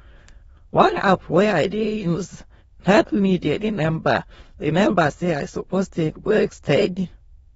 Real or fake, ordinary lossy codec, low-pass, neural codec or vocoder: fake; AAC, 24 kbps; 9.9 kHz; autoencoder, 22.05 kHz, a latent of 192 numbers a frame, VITS, trained on many speakers